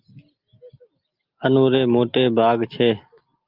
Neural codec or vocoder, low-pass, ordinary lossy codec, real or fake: none; 5.4 kHz; Opus, 24 kbps; real